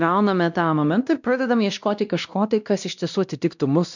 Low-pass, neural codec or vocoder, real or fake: 7.2 kHz; codec, 16 kHz, 1 kbps, X-Codec, WavLM features, trained on Multilingual LibriSpeech; fake